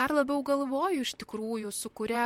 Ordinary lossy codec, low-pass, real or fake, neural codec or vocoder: MP3, 64 kbps; 19.8 kHz; fake; vocoder, 44.1 kHz, 128 mel bands, Pupu-Vocoder